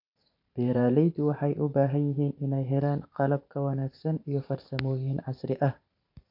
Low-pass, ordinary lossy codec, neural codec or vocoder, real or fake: 5.4 kHz; none; vocoder, 44.1 kHz, 128 mel bands every 512 samples, BigVGAN v2; fake